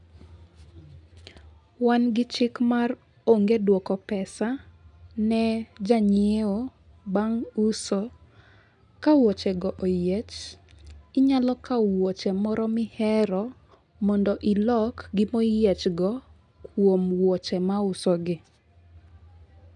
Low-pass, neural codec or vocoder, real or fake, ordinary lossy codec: 10.8 kHz; none; real; none